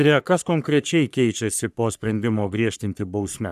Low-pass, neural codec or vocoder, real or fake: 14.4 kHz; codec, 44.1 kHz, 3.4 kbps, Pupu-Codec; fake